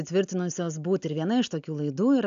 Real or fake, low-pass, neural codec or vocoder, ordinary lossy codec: real; 7.2 kHz; none; AAC, 96 kbps